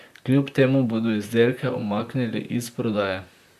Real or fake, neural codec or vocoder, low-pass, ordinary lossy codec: fake; vocoder, 44.1 kHz, 128 mel bands, Pupu-Vocoder; 14.4 kHz; none